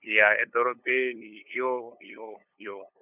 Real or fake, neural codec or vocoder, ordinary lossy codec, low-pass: fake; codec, 16 kHz, 8 kbps, FunCodec, trained on LibriTTS, 25 frames a second; none; 3.6 kHz